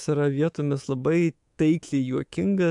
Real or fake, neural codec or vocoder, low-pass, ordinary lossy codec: fake; codec, 24 kHz, 3.1 kbps, DualCodec; 10.8 kHz; AAC, 64 kbps